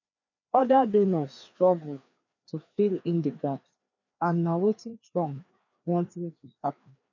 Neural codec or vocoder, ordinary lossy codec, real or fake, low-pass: codec, 16 kHz, 2 kbps, FreqCodec, larger model; none; fake; 7.2 kHz